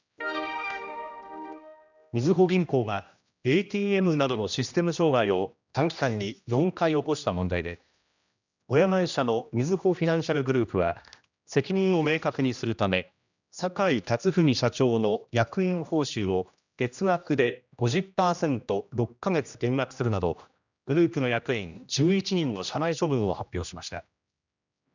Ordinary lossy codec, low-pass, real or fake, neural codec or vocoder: none; 7.2 kHz; fake; codec, 16 kHz, 1 kbps, X-Codec, HuBERT features, trained on general audio